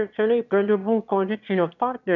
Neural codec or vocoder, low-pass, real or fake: autoencoder, 22.05 kHz, a latent of 192 numbers a frame, VITS, trained on one speaker; 7.2 kHz; fake